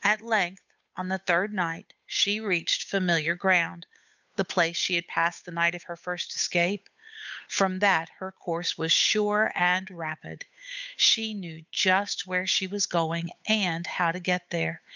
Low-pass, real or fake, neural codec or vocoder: 7.2 kHz; fake; codec, 16 kHz, 8 kbps, FunCodec, trained on Chinese and English, 25 frames a second